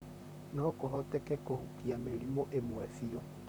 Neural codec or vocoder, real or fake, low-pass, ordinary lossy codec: vocoder, 44.1 kHz, 128 mel bands, Pupu-Vocoder; fake; none; none